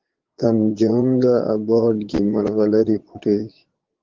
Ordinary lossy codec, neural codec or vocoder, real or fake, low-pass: Opus, 16 kbps; vocoder, 22.05 kHz, 80 mel bands, Vocos; fake; 7.2 kHz